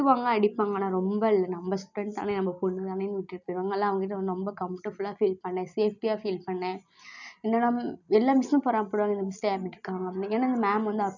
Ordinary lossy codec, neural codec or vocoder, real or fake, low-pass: none; none; real; 7.2 kHz